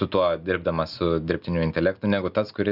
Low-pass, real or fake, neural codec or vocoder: 5.4 kHz; real; none